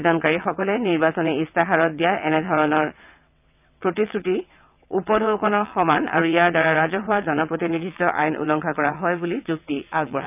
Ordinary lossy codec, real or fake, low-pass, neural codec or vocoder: none; fake; 3.6 kHz; vocoder, 22.05 kHz, 80 mel bands, WaveNeXt